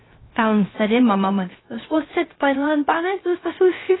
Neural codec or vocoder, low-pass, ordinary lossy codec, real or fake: codec, 16 kHz, 0.3 kbps, FocalCodec; 7.2 kHz; AAC, 16 kbps; fake